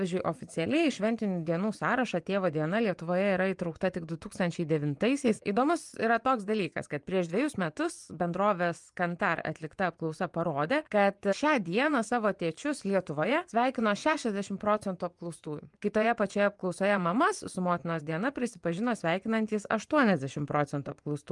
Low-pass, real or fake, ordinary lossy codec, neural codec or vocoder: 10.8 kHz; fake; Opus, 32 kbps; vocoder, 24 kHz, 100 mel bands, Vocos